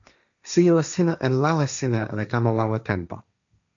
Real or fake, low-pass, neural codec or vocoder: fake; 7.2 kHz; codec, 16 kHz, 1.1 kbps, Voila-Tokenizer